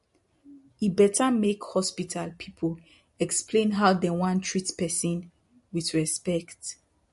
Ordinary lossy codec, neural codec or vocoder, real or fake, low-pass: MP3, 48 kbps; none; real; 14.4 kHz